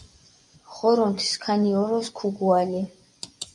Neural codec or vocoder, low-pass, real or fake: vocoder, 24 kHz, 100 mel bands, Vocos; 10.8 kHz; fake